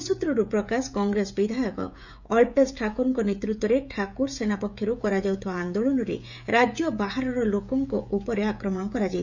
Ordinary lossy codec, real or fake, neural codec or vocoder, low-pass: none; fake; codec, 16 kHz, 16 kbps, FreqCodec, smaller model; 7.2 kHz